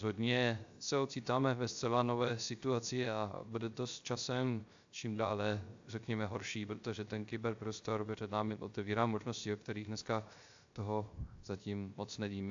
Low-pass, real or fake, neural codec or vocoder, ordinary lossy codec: 7.2 kHz; fake; codec, 16 kHz, 0.3 kbps, FocalCodec; AAC, 64 kbps